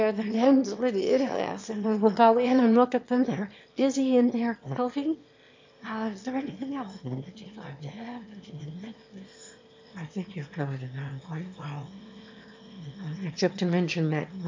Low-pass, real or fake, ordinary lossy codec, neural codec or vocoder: 7.2 kHz; fake; MP3, 48 kbps; autoencoder, 22.05 kHz, a latent of 192 numbers a frame, VITS, trained on one speaker